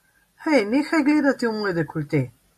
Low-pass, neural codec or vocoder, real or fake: 14.4 kHz; none; real